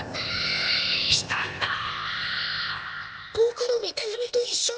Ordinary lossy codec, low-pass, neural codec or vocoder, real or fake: none; none; codec, 16 kHz, 0.8 kbps, ZipCodec; fake